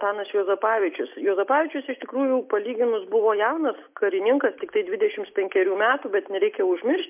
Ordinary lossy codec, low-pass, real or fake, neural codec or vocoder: MP3, 32 kbps; 3.6 kHz; real; none